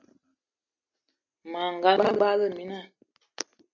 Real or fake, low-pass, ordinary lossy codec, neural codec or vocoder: real; 7.2 kHz; MP3, 64 kbps; none